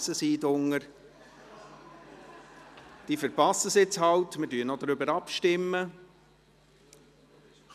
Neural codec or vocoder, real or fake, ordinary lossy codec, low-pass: none; real; none; 14.4 kHz